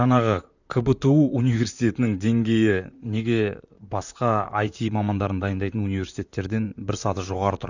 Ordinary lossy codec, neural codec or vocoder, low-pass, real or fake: none; vocoder, 44.1 kHz, 128 mel bands, Pupu-Vocoder; 7.2 kHz; fake